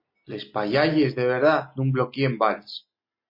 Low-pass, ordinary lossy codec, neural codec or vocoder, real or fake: 5.4 kHz; MP3, 32 kbps; none; real